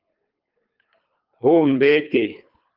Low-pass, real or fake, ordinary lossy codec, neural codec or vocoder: 5.4 kHz; fake; Opus, 32 kbps; codec, 24 kHz, 3 kbps, HILCodec